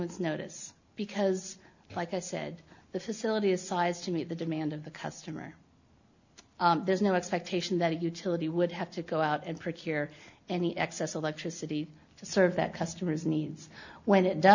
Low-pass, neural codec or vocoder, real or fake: 7.2 kHz; none; real